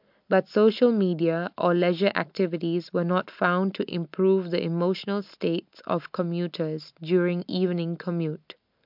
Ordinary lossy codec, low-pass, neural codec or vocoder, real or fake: MP3, 48 kbps; 5.4 kHz; none; real